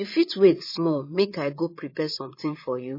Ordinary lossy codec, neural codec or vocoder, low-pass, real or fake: MP3, 24 kbps; vocoder, 22.05 kHz, 80 mel bands, Vocos; 5.4 kHz; fake